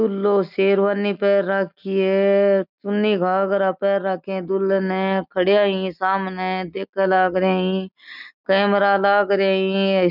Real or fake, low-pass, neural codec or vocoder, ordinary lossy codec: real; 5.4 kHz; none; none